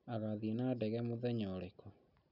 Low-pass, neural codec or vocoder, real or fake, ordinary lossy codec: 5.4 kHz; none; real; none